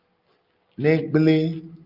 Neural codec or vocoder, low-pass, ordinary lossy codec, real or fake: none; 5.4 kHz; Opus, 24 kbps; real